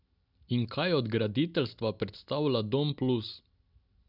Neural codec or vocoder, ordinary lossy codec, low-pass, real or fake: none; none; 5.4 kHz; real